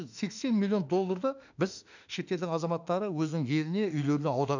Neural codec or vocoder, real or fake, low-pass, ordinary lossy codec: autoencoder, 48 kHz, 32 numbers a frame, DAC-VAE, trained on Japanese speech; fake; 7.2 kHz; none